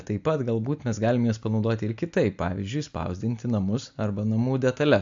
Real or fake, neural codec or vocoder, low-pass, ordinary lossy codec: real; none; 7.2 kHz; AAC, 64 kbps